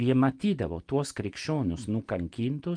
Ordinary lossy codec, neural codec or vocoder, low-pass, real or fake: Opus, 32 kbps; none; 9.9 kHz; real